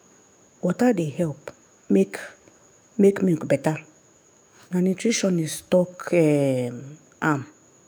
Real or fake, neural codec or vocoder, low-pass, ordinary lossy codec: fake; autoencoder, 48 kHz, 128 numbers a frame, DAC-VAE, trained on Japanese speech; none; none